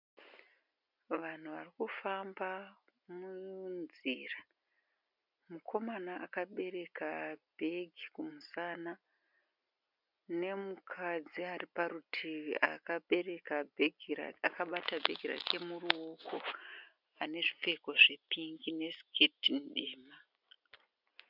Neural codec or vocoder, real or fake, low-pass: none; real; 5.4 kHz